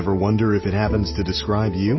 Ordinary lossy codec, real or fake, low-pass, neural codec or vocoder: MP3, 24 kbps; real; 7.2 kHz; none